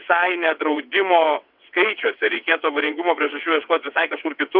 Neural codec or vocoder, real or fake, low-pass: vocoder, 22.05 kHz, 80 mel bands, WaveNeXt; fake; 5.4 kHz